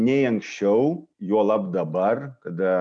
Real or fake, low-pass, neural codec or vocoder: real; 9.9 kHz; none